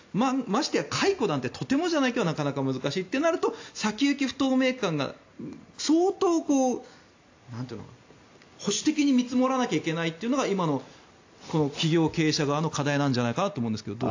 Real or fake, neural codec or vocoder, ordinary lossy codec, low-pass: real; none; AAC, 48 kbps; 7.2 kHz